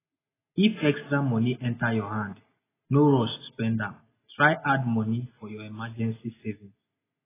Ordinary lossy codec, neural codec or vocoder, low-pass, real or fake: AAC, 16 kbps; none; 3.6 kHz; real